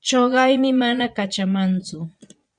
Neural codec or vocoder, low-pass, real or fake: vocoder, 22.05 kHz, 80 mel bands, Vocos; 9.9 kHz; fake